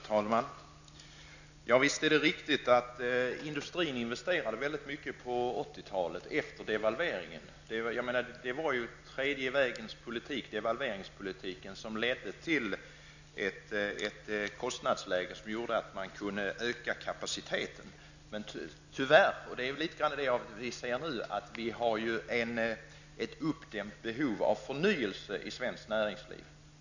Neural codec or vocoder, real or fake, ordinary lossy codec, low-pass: none; real; none; 7.2 kHz